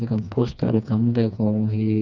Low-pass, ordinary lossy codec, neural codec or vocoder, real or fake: 7.2 kHz; none; codec, 16 kHz, 2 kbps, FreqCodec, smaller model; fake